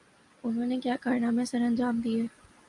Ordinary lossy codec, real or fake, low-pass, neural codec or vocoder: AAC, 64 kbps; real; 10.8 kHz; none